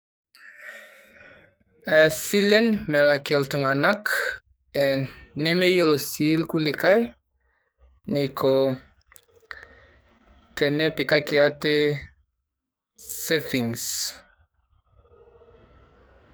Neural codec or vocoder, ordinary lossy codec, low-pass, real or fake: codec, 44.1 kHz, 2.6 kbps, SNAC; none; none; fake